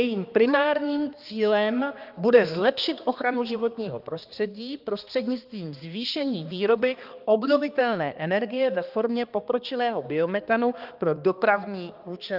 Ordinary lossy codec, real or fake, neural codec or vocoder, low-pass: Opus, 24 kbps; fake; codec, 16 kHz, 2 kbps, X-Codec, HuBERT features, trained on balanced general audio; 5.4 kHz